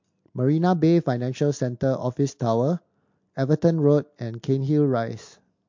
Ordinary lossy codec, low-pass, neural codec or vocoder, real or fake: MP3, 48 kbps; 7.2 kHz; none; real